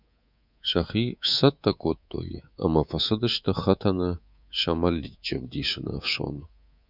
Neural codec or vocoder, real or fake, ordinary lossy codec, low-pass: codec, 24 kHz, 3.1 kbps, DualCodec; fake; Opus, 64 kbps; 5.4 kHz